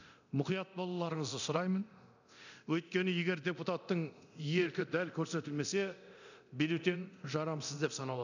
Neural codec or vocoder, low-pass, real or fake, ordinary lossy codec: codec, 24 kHz, 0.9 kbps, DualCodec; 7.2 kHz; fake; none